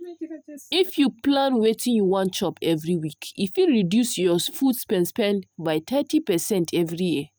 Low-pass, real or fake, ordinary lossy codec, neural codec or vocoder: none; real; none; none